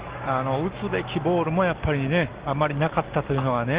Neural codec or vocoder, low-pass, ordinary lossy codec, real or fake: none; 3.6 kHz; Opus, 16 kbps; real